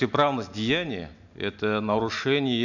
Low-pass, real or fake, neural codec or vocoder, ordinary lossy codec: 7.2 kHz; real; none; none